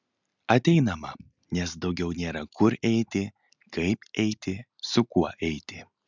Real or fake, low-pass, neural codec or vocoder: real; 7.2 kHz; none